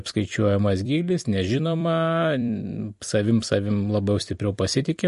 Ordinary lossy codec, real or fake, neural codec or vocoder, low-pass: MP3, 48 kbps; fake; vocoder, 44.1 kHz, 128 mel bands every 256 samples, BigVGAN v2; 14.4 kHz